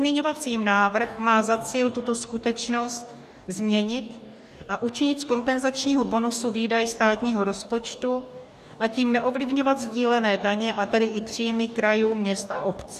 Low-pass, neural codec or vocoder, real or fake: 14.4 kHz; codec, 44.1 kHz, 2.6 kbps, DAC; fake